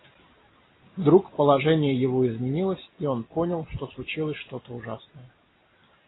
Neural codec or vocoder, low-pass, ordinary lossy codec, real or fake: none; 7.2 kHz; AAC, 16 kbps; real